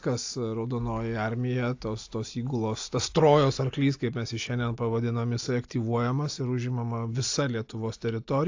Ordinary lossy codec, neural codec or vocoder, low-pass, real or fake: AAC, 48 kbps; none; 7.2 kHz; real